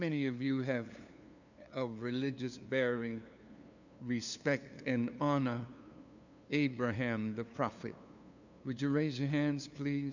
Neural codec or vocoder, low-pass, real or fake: codec, 16 kHz, 2 kbps, FunCodec, trained on LibriTTS, 25 frames a second; 7.2 kHz; fake